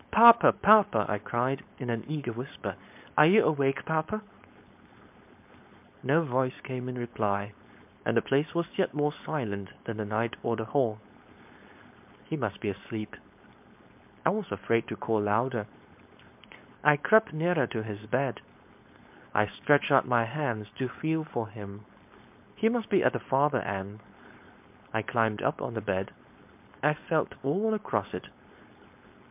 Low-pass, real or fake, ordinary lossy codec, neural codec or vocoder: 3.6 kHz; fake; MP3, 32 kbps; codec, 16 kHz, 4.8 kbps, FACodec